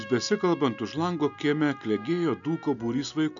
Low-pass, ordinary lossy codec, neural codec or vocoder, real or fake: 7.2 kHz; MP3, 96 kbps; none; real